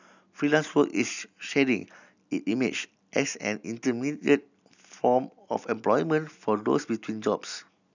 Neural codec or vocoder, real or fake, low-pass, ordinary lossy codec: none; real; 7.2 kHz; none